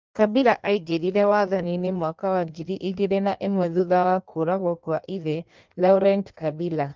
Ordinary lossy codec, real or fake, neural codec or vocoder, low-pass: Opus, 32 kbps; fake; codec, 16 kHz in and 24 kHz out, 1.1 kbps, FireRedTTS-2 codec; 7.2 kHz